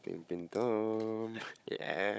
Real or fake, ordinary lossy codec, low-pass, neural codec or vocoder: fake; none; none; codec, 16 kHz, 16 kbps, FunCodec, trained on Chinese and English, 50 frames a second